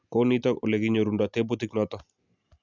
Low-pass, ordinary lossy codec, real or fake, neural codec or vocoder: 7.2 kHz; none; real; none